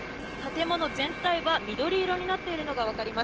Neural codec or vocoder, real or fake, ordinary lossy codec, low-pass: none; real; Opus, 16 kbps; 7.2 kHz